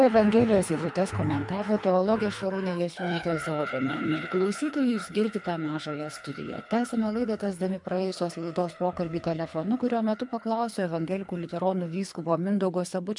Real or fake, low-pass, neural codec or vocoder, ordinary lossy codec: fake; 10.8 kHz; codec, 24 kHz, 3 kbps, HILCodec; MP3, 96 kbps